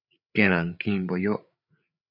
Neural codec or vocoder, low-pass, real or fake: codec, 16 kHz, 8 kbps, FreqCodec, larger model; 5.4 kHz; fake